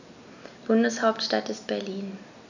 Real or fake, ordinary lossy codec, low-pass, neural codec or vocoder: real; none; 7.2 kHz; none